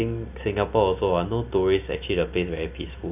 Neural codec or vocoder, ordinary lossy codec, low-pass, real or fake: none; none; 3.6 kHz; real